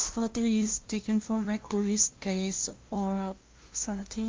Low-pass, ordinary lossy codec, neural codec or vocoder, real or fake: 7.2 kHz; Opus, 24 kbps; codec, 16 kHz, 0.5 kbps, FunCodec, trained on LibriTTS, 25 frames a second; fake